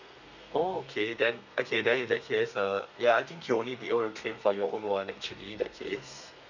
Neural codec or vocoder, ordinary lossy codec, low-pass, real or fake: codec, 32 kHz, 1.9 kbps, SNAC; none; 7.2 kHz; fake